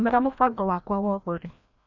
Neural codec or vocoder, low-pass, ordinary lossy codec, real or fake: codec, 16 kHz, 1 kbps, FreqCodec, larger model; 7.2 kHz; none; fake